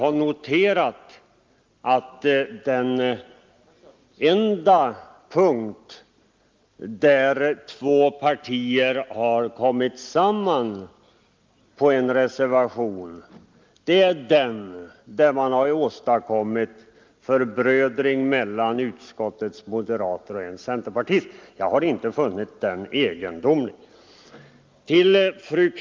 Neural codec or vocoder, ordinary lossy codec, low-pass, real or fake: none; Opus, 32 kbps; 7.2 kHz; real